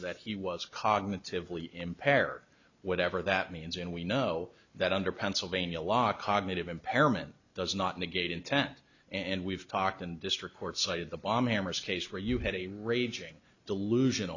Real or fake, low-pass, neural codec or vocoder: real; 7.2 kHz; none